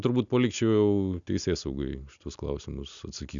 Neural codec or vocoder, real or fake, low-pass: none; real; 7.2 kHz